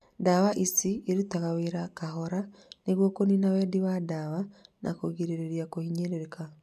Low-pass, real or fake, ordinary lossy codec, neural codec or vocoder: 14.4 kHz; real; none; none